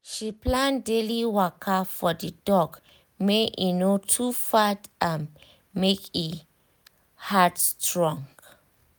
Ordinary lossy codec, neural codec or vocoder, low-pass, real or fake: none; none; none; real